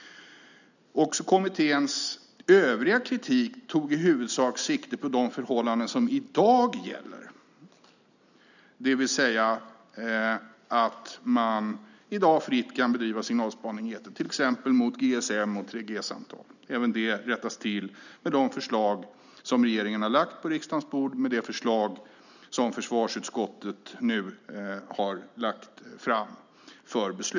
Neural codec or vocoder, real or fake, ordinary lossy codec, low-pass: none; real; none; 7.2 kHz